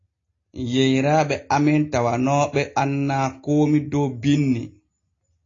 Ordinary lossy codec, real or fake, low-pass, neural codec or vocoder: AAC, 32 kbps; real; 7.2 kHz; none